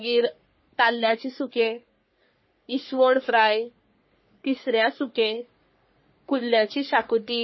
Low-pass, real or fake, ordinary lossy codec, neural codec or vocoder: 7.2 kHz; fake; MP3, 24 kbps; codec, 44.1 kHz, 3.4 kbps, Pupu-Codec